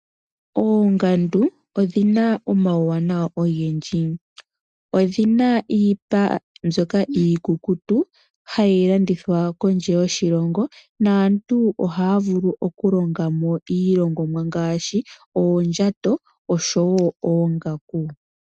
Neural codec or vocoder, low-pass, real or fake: none; 9.9 kHz; real